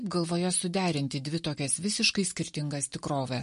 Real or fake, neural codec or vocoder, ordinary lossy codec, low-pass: real; none; MP3, 48 kbps; 10.8 kHz